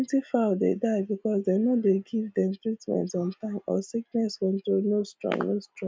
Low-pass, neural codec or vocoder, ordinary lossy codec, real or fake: none; none; none; real